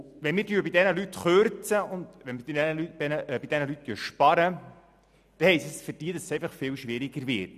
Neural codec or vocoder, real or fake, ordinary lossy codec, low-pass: none; real; none; 14.4 kHz